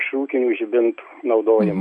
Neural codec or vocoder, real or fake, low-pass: none; real; 9.9 kHz